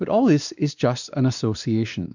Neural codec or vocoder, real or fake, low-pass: codec, 16 kHz, 2 kbps, X-Codec, WavLM features, trained on Multilingual LibriSpeech; fake; 7.2 kHz